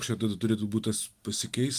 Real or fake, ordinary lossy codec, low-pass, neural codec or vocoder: real; Opus, 32 kbps; 14.4 kHz; none